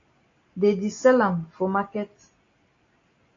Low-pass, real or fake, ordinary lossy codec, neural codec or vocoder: 7.2 kHz; real; AAC, 32 kbps; none